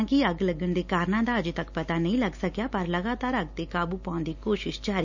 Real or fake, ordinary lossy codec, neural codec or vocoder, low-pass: real; none; none; 7.2 kHz